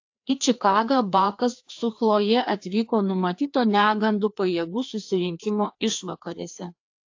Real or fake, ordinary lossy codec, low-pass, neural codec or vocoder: fake; AAC, 48 kbps; 7.2 kHz; codec, 16 kHz, 2 kbps, FreqCodec, larger model